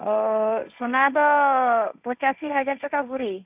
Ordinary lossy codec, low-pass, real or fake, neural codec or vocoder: none; 3.6 kHz; fake; codec, 16 kHz, 1.1 kbps, Voila-Tokenizer